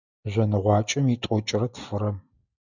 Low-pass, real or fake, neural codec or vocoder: 7.2 kHz; real; none